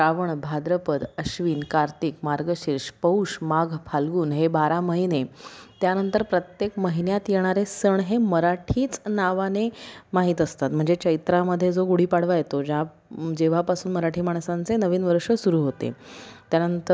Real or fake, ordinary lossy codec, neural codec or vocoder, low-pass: real; none; none; none